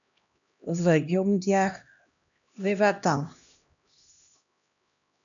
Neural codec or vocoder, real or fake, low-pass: codec, 16 kHz, 1 kbps, X-Codec, HuBERT features, trained on LibriSpeech; fake; 7.2 kHz